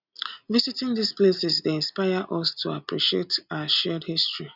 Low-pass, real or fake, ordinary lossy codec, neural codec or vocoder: 5.4 kHz; real; none; none